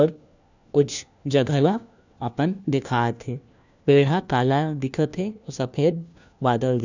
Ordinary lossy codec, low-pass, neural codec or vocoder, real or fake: none; 7.2 kHz; codec, 16 kHz, 1 kbps, FunCodec, trained on LibriTTS, 50 frames a second; fake